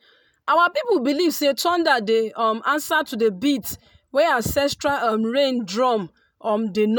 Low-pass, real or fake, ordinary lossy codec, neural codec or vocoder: none; real; none; none